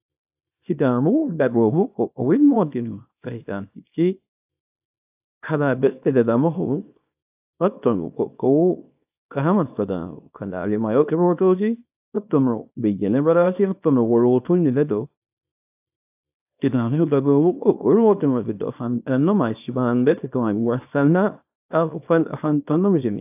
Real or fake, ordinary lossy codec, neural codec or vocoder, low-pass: fake; AAC, 32 kbps; codec, 24 kHz, 0.9 kbps, WavTokenizer, small release; 3.6 kHz